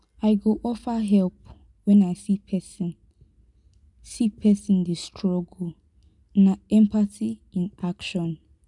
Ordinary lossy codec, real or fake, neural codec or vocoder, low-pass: none; real; none; 10.8 kHz